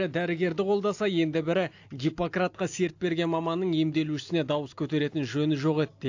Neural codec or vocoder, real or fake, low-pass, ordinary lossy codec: none; real; 7.2 kHz; AAC, 48 kbps